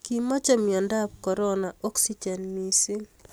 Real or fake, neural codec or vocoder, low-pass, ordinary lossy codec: real; none; none; none